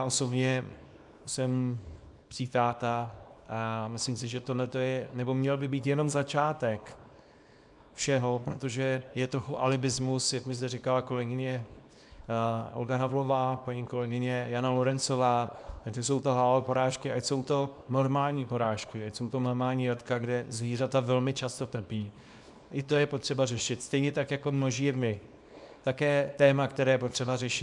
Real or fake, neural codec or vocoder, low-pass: fake; codec, 24 kHz, 0.9 kbps, WavTokenizer, small release; 10.8 kHz